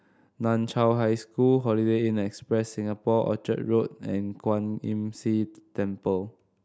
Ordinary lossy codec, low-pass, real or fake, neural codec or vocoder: none; none; real; none